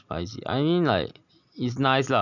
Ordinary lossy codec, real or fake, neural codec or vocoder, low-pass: none; real; none; 7.2 kHz